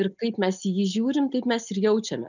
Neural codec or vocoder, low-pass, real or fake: none; 7.2 kHz; real